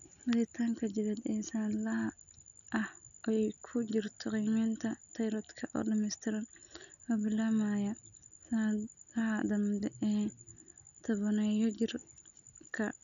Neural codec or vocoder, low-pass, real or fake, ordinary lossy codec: none; 7.2 kHz; real; none